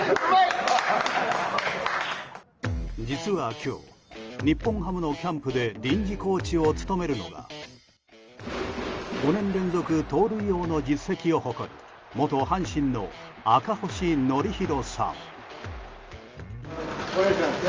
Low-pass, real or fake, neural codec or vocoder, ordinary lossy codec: 7.2 kHz; real; none; Opus, 24 kbps